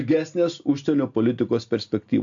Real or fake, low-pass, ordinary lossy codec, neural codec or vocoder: real; 7.2 kHz; AAC, 64 kbps; none